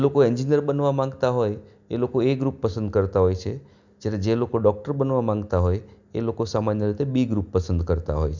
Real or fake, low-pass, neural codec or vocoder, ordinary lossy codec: real; 7.2 kHz; none; none